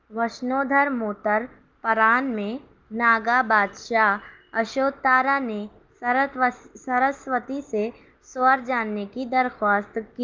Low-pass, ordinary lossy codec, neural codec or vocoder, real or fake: 7.2 kHz; Opus, 32 kbps; none; real